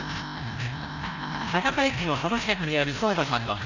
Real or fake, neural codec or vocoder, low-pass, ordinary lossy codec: fake; codec, 16 kHz, 0.5 kbps, FreqCodec, larger model; 7.2 kHz; AAC, 48 kbps